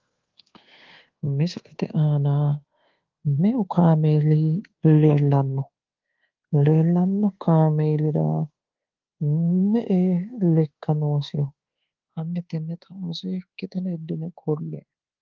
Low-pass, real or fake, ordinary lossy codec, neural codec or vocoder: 7.2 kHz; fake; Opus, 16 kbps; codec, 24 kHz, 1.2 kbps, DualCodec